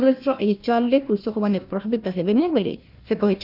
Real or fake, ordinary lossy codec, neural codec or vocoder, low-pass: fake; none; codec, 16 kHz, 1 kbps, FunCodec, trained on Chinese and English, 50 frames a second; 5.4 kHz